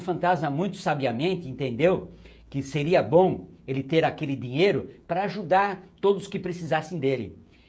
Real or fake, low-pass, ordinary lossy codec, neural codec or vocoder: fake; none; none; codec, 16 kHz, 16 kbps, FreqCodec, smaller model